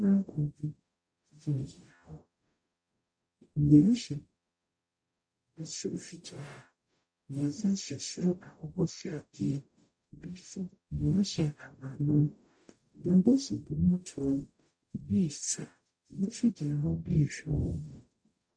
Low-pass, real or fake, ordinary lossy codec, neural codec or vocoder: 9.9 kHz; fake; MP3, 64 kbps; codec, 44.1 kHz, 0.9 kbps, DAC